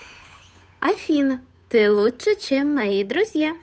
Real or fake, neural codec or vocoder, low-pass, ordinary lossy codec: fake; codec, 16 kHz, 8 kbps, FunCodec, trained on Chinese and English, 25 frames a second; none; none